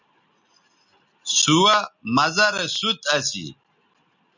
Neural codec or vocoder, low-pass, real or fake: none; 7.2 kHz; real